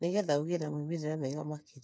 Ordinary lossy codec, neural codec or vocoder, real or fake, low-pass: none; codec, 16 kHz, 8 kbps, FreqCodec, smaller model; fake; none